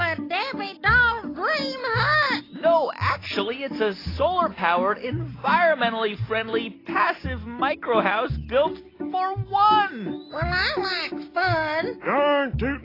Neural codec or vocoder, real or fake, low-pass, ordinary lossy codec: none; real; 5.4 kHz; AAC, 24 kbps